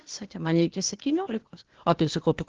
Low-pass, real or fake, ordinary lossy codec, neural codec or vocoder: 7.2 kHz; fake; Opus, 16 kbps; codec, 16 kHz, 0.8 kbps, ZipCodec